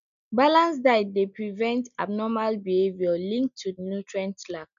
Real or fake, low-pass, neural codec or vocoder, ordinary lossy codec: real; 7.2 kHz; none; none